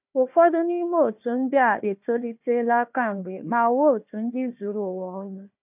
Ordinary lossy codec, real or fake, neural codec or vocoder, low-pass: none; fake; codec, 16 kHz, 1 kbps, FunCodec, trained on Chinese and English, 50 frames a second; 3.6 kHz